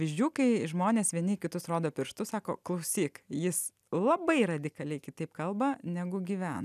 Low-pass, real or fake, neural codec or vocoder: 14.4 kHz; real; none